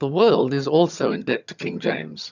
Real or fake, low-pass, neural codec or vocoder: fake; 7.2 kHz; vocoder, 22.05 kHz, 80 mel bands, HiFi-GAN